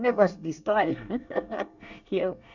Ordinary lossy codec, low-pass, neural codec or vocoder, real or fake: none; 7.2 kHz; codec, 24 kHz, 1 kbps, SNAC; fake